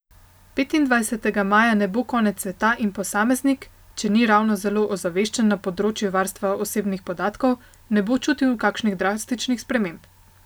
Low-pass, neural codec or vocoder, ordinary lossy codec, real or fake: none; none; none; real